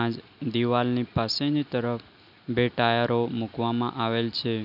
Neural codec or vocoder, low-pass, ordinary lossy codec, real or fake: none; 5.4 kHz; none; real